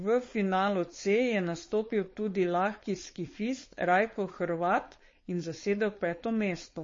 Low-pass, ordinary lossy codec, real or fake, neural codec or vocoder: 7.2 kHz; MP3, 32 kbps; fake; codec, 16 kHz, 4.8 kbps, FACodec